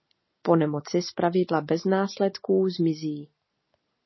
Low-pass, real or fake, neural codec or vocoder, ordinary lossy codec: 7.2 kHz; real; none; MP3, 24 kbps